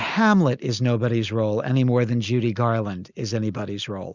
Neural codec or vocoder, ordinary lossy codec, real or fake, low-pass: none; Opus, 64 kbps; real; 7.2 kHz